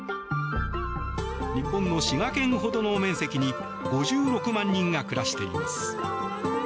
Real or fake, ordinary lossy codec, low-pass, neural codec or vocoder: real; none; none; none